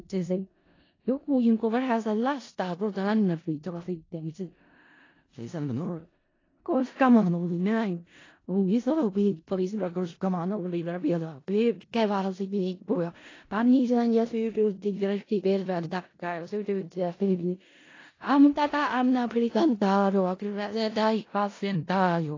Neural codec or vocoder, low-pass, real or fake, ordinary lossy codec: codec, 16 kHz in and 24 kHz out, 0.4 kbps, LongCat-Audio-Codec, four codebook decoder; 7.2 kHz; fake; AAC, 32 kbps